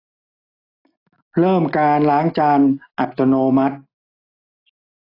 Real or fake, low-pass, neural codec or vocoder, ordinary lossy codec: real; 5.4 kHz; none; AAC, 24 kbps